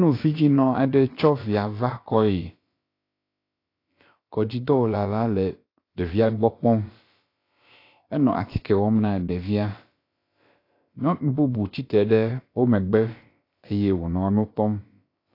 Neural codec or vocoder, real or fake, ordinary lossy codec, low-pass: codec, 16 kHz, about 1 kbps, DyCAST, with the encoder's durations; fake; AAC, 32 kbps; 5.4 kHz